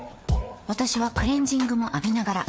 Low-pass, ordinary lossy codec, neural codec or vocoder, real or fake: none; none; codec, 16 kHz, 4 kbps, FreqCodec, larger model; fake